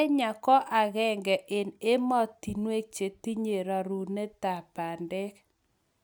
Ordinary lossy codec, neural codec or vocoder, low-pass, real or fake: none; none; none; real